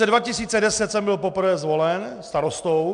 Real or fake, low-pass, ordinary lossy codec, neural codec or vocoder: real; 9.9 kHz; MP3, 96 kbps; none